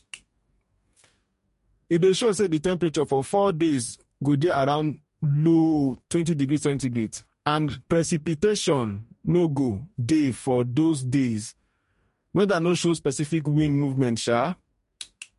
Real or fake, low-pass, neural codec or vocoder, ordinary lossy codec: fake; 14.4 kHz; codec, 44.1 kHz, 2.6 kbps, DAC; MP3, 48 kbps